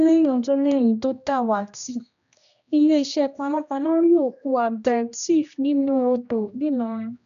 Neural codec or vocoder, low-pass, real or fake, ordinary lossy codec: codec, 16 kHz, 1 kbps, X-Codec, HuBERT features, trained on general audio; 7.2 kHz; fake; none